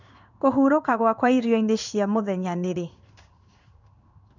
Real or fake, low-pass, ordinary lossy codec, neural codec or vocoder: fake; 7.2 kHz; none; codec, 16 kHz in and 24 kHz out, 1 kbps, XY-Tokenizer